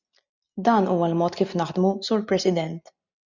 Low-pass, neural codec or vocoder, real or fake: 7.2 kHz; none; real